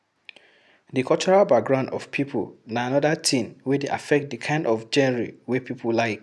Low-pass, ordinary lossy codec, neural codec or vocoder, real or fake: none; none; none; real